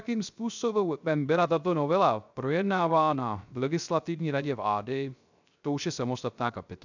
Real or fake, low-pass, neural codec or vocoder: fake; 7.2 kHz; codec, 16 kHz, 0.3 kbps, FocalCodec